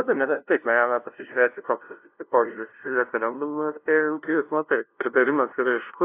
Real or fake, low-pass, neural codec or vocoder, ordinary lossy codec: fake; 3.6 kHz; codec, 16 kHz, 0.5 kbps, FunCodec, trained on LibriTTS, 25 frames a second; AAC, 24 kbps